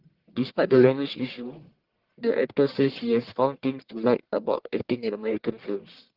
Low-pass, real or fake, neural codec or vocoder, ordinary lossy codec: 5.4 kHz; fake; codec, 44.1 kHz, 1.7 kbps, Pupu-Codec; Opus, 16 kbps